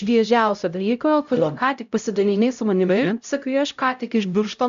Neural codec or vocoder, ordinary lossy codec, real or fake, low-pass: codec, 16 kHz, 0.5 kbps, X-Codec, HuBERT features, trained on LibriSpeech; AAC, 96 kbps; fake; 7.2 kHz